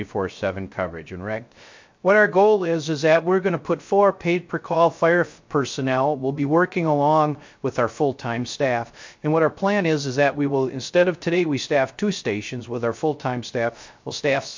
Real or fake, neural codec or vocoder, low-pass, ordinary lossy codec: fake; codec, 16 kHz, 0.3 kbps, FocalCodec; 7.2 kHz; MP3, 48 kbps